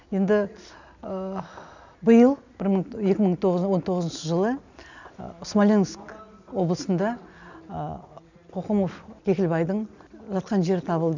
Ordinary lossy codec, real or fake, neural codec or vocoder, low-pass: none; real; none; 7.2 kHz